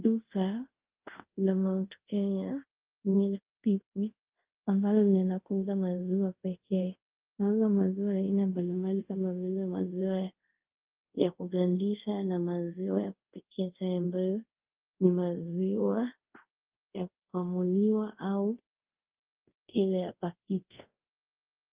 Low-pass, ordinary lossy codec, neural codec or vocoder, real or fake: 3.6 kHz; Opus, 32 kbps; codec, 24 kHz, 0.5 kbps, DualCodec; fake